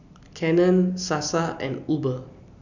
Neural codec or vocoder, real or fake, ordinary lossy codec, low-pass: none; real; none; 7.2 kHz